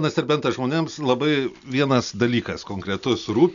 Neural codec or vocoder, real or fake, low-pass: none; real; 7.2 kHz